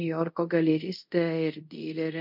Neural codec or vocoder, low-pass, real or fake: codec, 24 kHz, 0.5 kbps, DualCodec; 5.4 kHz; fake